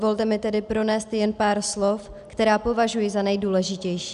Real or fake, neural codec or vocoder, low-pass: real; none; 10.8 kHz